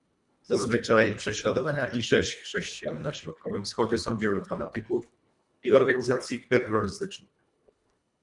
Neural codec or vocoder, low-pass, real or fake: codec, 24 kHz, 1.5 kbps, HILCodec; 10.8 kHz; fake